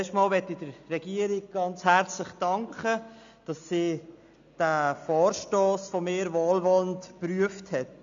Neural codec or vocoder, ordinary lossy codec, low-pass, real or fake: none; AAC, 64 kbps; 7.2 kHz; real